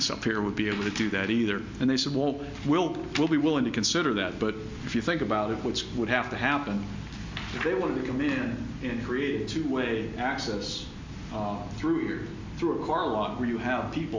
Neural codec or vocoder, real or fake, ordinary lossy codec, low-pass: none; real; MP3, 64 kbps; 7.2 kHz